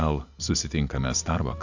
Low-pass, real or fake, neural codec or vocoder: 7.2 kHz; real; none